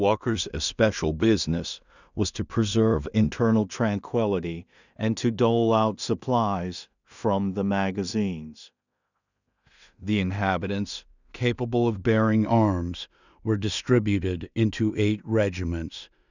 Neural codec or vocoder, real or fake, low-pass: codec, 16 kHz in and 24 kHz out, 0.4 kbps, LongCat-Audio-Codec, two codebook decoder; fake; 7.2 kHz